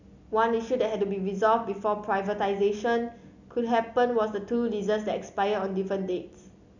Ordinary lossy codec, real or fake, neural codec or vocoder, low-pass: none; real; none; 7.2 kHz